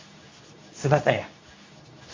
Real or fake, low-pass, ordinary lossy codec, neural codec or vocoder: fake; 7.2 kHz; MP3, 48 kbps; codec, 24 kHz, 0.9 kbps, WavTokenizer, medium speech release version 2